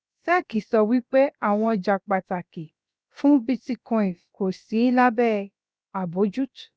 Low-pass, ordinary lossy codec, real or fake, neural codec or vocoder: none; none; fake; codec, 16 kHz, about 1 kbps, DyCAST, with the encoder's durations